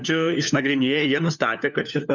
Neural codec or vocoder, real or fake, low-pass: codec, 16 kHz, 4 kbps, FunCodec, trained on LibriTTS, 50 frames a second; fake; 7.2 kHz